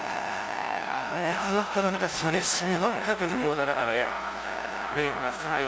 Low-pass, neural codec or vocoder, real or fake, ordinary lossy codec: none; codec, 16 kHz, 0.5 kbps, FunCodec, trained on LibriTTS, 25 frames a second; fake; none